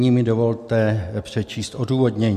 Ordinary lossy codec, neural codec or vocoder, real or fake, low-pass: MP3, 64 kbps; none; real; 14.4 kHz